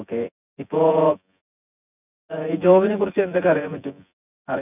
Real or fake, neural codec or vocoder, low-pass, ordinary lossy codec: fake; vocoder, 24 kHz, 100 mel bands, Vocos; 3.6 kHz; none